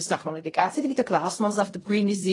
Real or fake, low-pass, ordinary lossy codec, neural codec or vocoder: fake; 10.8 kHz; AAC, 32 kbps; codec, 16 kHz in and 24 kHz out, 0.4 kbps, LongCat-Audio-Codec, fine tuned four codebook decoder